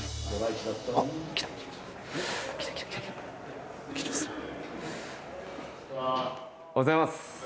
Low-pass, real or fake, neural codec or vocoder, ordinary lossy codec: none; real; none; none